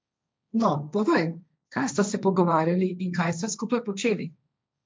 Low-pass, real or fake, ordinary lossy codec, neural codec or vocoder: none; fake; none; codec, 16 kHz, 1.1 kbps, Voila-Tokenizer